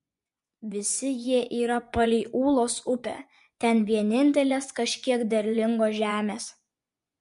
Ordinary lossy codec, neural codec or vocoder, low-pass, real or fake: MP3, 64 kbps; vocoder, 24 kHz, 100 mel bands, Vocos; 10.8 kHz; fake